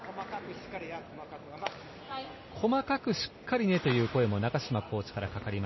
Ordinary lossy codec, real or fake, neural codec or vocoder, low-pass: MP3, 24 kbps; real; none; 7.2 kHz